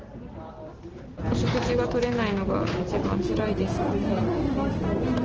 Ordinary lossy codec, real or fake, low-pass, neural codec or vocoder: Opus, 16 kbps; real; 7.2 kHz; none